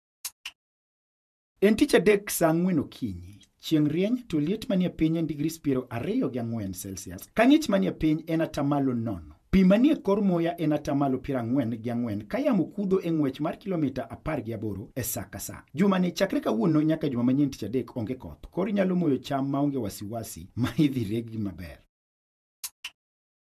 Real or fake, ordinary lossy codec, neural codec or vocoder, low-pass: fake; none; vocoder, 48 kHz, 128 mel bands, Vocos; 14.4 kHz